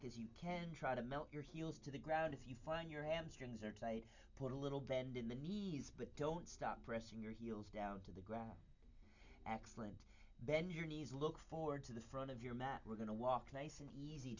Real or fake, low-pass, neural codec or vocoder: real; 7.2 kHz; none